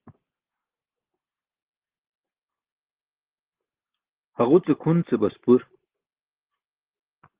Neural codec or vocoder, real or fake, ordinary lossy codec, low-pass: none; real; Opus, 16 kbps; 3.6 kHz